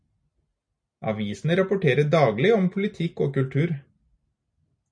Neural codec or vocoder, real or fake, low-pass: none; real; 9.9 kHz